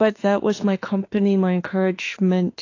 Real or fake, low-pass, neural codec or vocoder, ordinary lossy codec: fake; 7.2 kHz; autoencoder, 48 kHz, 32 numbers a frame, DAC-VAE, trained on Japanese speech; AAC, 32 kbps